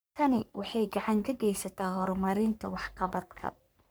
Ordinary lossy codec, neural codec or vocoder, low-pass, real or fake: none; codec, 44.1 kHz, 3.4 kbps, Pupu-Codec; none; fake